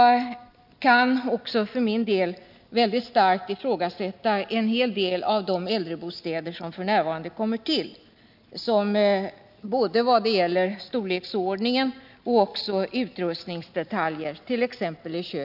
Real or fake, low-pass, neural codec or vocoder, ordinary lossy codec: real; 5.4 kHz; none; none